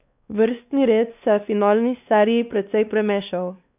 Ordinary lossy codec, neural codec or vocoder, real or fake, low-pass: none; codec, 16 kHz, 2 kbps, X-Codec, WavLM features, trained on Multilingual LibriSpeech; fake; 3.6 kHz